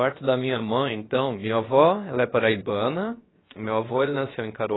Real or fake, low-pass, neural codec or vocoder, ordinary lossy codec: fake; 7.2 kHz; codec, 16 kHz, about 1 kbps, DyCAST, with the encoder's durations; AAC, 16 kbps